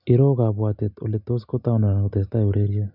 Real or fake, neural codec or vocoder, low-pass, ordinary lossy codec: real; none; 5.4 kHz; none